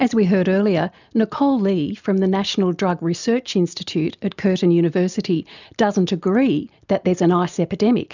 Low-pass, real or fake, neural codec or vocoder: 7.2 kHz; real; none